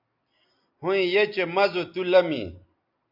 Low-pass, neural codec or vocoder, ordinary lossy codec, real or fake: 5.4 kHz; none; MP3, 48 kbps; real